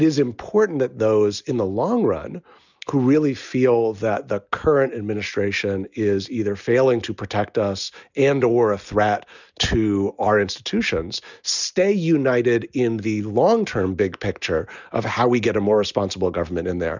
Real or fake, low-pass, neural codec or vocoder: real; 7.2 kHz; none